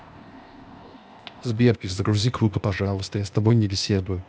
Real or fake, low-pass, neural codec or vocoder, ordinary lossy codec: fake; none; codec, 16 kHz, 0.8 kbps, ZipCodec; none